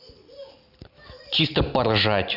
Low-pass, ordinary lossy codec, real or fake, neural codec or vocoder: 5.4 kHz; none; real; none